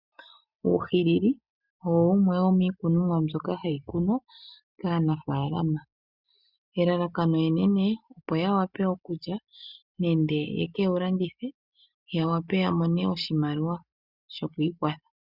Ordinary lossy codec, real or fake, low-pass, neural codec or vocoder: Opus, 64 kbps; real; 5.4 kHz; none